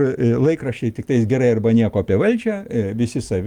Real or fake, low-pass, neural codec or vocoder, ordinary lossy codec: real; 19.8 kHz; none; Opus, 32 kbps